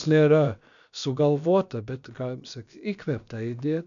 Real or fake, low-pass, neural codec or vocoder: fake; 7.2 kHz; codec, 16 kHz, about 1 kbps, DyCAST, with the encoder's durations